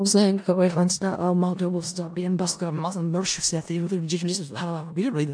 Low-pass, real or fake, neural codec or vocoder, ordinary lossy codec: 9.9 kHz; fake; codec, 16 kHz in and 24 kHz out, 0.4 kbps, LongCat-Audio-Codec, four codebook decoder; AAC, 64 kbps